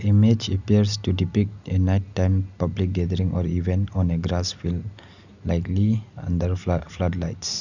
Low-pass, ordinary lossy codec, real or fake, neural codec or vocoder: 7.2 kHz; none; real; none